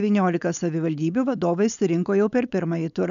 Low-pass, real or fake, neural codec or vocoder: 7.2 kHz; fake; codec, 16 kHz, 4.8 kbps, FACodec